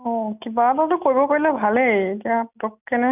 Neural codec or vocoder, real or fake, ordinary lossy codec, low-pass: none; real; none; 3.6 kHz